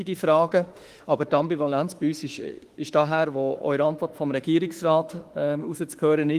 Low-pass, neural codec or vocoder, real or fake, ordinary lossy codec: 14.4 kHz; autoencoder, 48 kHz, 32 numbers a frame, DAC-VAE, trained on Japanese speech; fake; Opus, 24 kbps